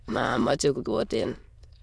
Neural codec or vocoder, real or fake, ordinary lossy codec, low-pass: autoencoder, 22.05 kHz, a latent of 192 numbers a frame, VITS, trained on many speakers; fake; none; none